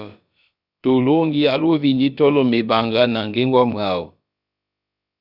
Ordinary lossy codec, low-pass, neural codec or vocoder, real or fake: Opus, 64 kbps; 5.4 kHz; codec, 16 kHz, about 1 kbps, DyCAST, with the encoder's durations; fake